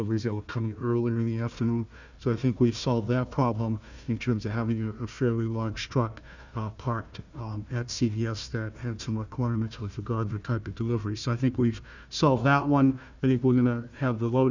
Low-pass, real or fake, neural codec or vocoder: 7.2 kHz; fake; codec, 16 kHz, 1 kbps, FunCodec, trained on Chinese and English, 50 frames a second